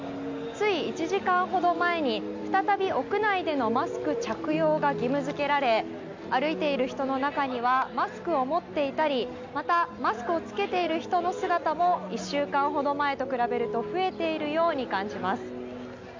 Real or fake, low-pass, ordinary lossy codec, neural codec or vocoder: real; 7.2 kHz; MP3, 64 kbps; none